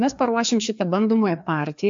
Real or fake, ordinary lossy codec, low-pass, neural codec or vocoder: fake; AAC, 64 kbps; 7.2 kHz; codec, 16 kHz, 2 kbps, FreqCodec, larger model